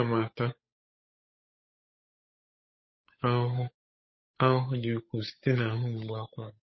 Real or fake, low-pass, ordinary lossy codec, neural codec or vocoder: fake; 7.2 kHz; MP3, 24 kbps; codec, 16 kHz, 8 kbps, FunCodec, trained on Chinese and English, 25 frames a second